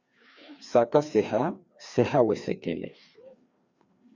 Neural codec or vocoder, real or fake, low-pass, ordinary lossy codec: codec, 16 kHz, 2 kbps, FreqCodec, larger model; fake; 7.2 kHz; Opus, 64 kbps